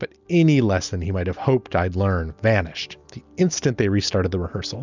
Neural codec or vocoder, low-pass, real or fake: none; 7.2 kHz; real